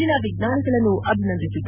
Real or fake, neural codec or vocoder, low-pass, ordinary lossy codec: real; none; 3.6 kHz; none